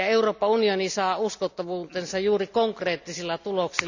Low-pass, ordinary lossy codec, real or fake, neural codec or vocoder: 7.2 kHz; none; real; none